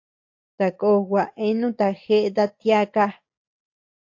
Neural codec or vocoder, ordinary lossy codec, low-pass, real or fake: none; AAC, 48 kbps; 7.2 kHz; real